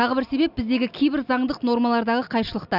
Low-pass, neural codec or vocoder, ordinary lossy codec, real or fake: 5.4 kHz; none; none; real